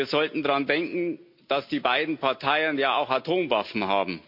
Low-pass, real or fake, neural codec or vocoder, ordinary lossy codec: 5.4 kHz; real; none; MP3, 48 kbps